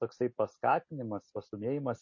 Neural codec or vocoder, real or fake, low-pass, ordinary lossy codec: none; real; 7.2 kHz; MP3, 32 kbps